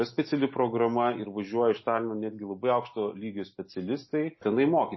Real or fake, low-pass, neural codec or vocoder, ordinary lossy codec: real; 7.2 kHz; none; MP3, 24 kbps